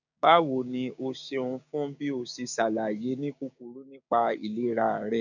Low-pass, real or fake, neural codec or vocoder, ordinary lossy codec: 7.2 kHz; fake; codec, 16 kHz, 6 kbps, DAC; none